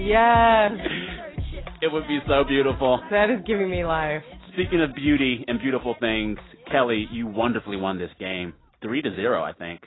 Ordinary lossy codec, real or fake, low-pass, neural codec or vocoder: AAC, 16 kbps; real; 7.2 kHz; none